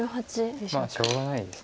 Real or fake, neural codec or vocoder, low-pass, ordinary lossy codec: real; none; none; none